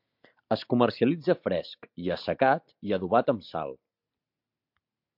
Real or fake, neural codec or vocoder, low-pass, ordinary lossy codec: real; none; 5.4 kHz; MP3, 48 kbps